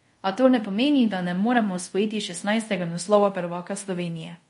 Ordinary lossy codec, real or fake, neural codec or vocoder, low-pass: MP3, 48 kbps; fake; codec, 24 kHz, 0.5 kbps, DualCodec; 10.8 kHz